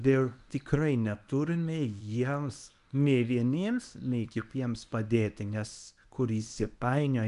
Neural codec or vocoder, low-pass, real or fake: codec, 24 kHz, 0.9 kbps, WavTokenizer, medium speech release version 1; 10.8 kHz; fake